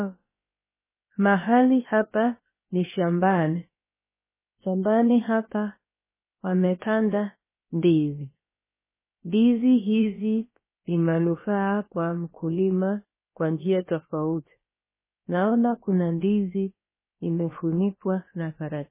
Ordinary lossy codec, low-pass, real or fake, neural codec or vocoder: MP3, 16 kbps; 3.6 kHz; fake; codec, 16 kHz, about 1 kbps, DyCAST, with the encoder's durations